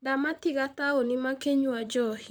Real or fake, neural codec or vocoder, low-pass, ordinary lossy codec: fake; vocoder, 44.1 kHz, 128 mel bands, Pupu-Vocoder; none; none